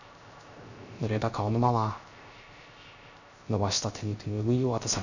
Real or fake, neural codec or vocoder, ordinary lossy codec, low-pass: fake; codec, 16 kHz, 0.3 kbps, FocalCodec; none; 7.2 kHz